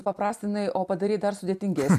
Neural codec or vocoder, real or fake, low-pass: none; real; 14.4 kHz